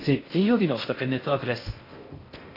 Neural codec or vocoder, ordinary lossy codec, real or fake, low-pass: codec, 16 kHz in and 24 kHz out, 0.6 kbps, FocalCodec, streaming, 2048 codes; AAC, 24 kbps; fake; 5.4 kHz